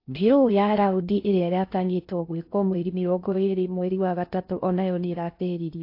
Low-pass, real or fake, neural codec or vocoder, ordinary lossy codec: 5.4 kHz; fake; codec, 16 kHz in and 24 kHz out, 0.6 kbps, FocalCodec, streaming, 4096 codes; AAC, 32 kbps